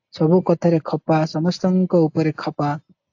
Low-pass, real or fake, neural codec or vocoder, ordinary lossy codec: 7.2 kHz; real; none; AAC, 48 kbps